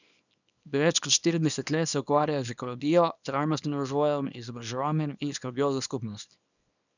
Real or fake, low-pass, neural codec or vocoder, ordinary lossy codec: fake; 7.2 kHz; codec, 24 kHz, 0.9 kbps, WavTokenizer, small release; none